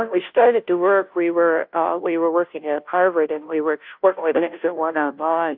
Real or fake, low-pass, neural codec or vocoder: fake; 5.4 kHz; codec, 16 kHz, 0.5 kbps, FunCodec, trained on Chinese and English, 25 frames a second